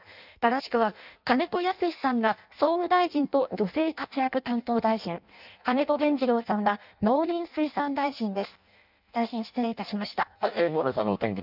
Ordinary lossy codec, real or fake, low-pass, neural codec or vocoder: none; fake; 5.4 kHz; codec, 16 kHz in and 24 kHz out, 0.6 kbps, FireRedTTS-2 codec